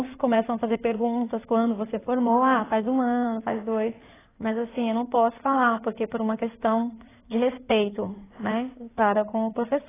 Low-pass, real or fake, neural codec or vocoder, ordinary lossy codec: 3.6 kHz; fake; codec, 16 kHz in and 24 kHz out, 2.2 kbps, FireRedTTS-2 codec; AAC, 16 kbps